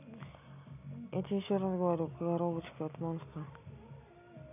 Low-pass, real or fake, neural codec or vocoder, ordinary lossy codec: 3.6 kHz; real; none; none